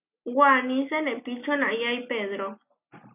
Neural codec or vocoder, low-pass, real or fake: none; 3.6 kHz; real